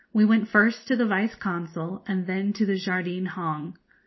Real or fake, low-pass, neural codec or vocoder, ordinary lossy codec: real; 7.2 kHz; none; MP3, 24 kbps